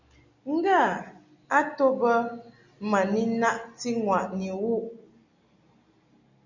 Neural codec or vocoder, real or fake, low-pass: none; real; 7.2 kHz